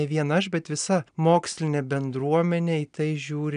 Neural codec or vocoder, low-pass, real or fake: none; 9.9 kHz; real